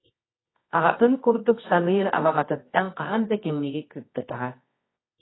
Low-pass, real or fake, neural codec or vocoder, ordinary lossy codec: 7.2 kHz; fake; codec, 24 kHz, 0.9 kbps, WavTokenizer, medium music audio release; AAC, 16 kbps